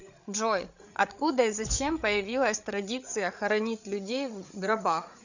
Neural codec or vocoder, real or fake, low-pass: codec, 16 kHz, 8 kbps, FreqCodec, larger model; fake; 7.2 kHz